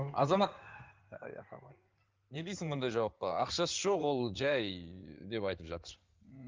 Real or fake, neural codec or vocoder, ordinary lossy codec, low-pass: fake; codec, 16 kHz in and 24 kHz out, 2.2 kbps, FireRedTTS-2 codec; Opus, 32 kbps; 7.2 kHz